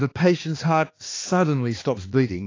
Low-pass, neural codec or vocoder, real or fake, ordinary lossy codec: 7.2 kHz; codec, 16 kHz, 2 kbps, X-Codec, HuBERT features, trained on balanced general audio; fake; AAC, 32 kbps